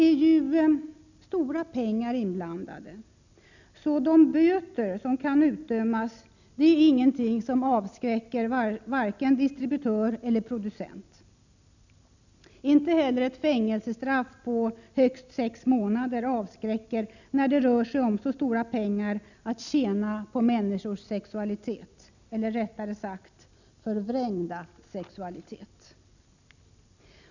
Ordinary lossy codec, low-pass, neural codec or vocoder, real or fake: none; 7.2 kHz; none; real